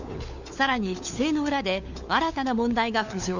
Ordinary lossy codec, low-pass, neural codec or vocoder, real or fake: none; 7.2 kHz; codec, 16 kHz, 4 kbps, FunCodec, trained on LibriTTS, 50 frames a second; fake